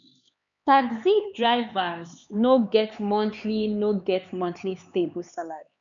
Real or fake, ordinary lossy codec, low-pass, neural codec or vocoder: fake; none; 7.2 kHz; codec, 16 kHz, 4 kbps, X-Codec, HuBERT features, trained on LibriSpeech